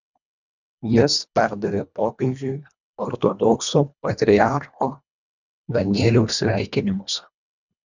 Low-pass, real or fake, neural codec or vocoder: 7.2 kHz; fake; codec, 24 kHz, 1.5 kbps, HILCodec